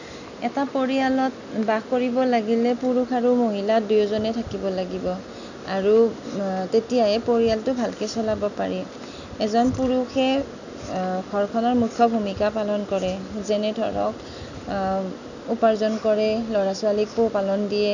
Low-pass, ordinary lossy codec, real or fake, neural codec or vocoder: 7.2 kHz; none; real; none